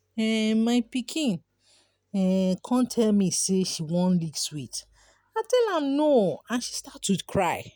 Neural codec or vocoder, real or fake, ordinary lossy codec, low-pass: none; real; none; none